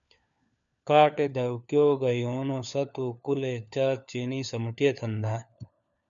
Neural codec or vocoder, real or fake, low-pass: codec, 16 kHz, 4 kbps, FunCodec, trained on LibriTTS, 50 frames a second; fake; 7.2 kHz